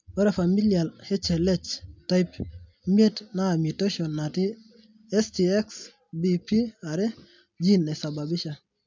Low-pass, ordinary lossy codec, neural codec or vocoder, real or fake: 7.2 kHz; MP3, 64 kbps; none; real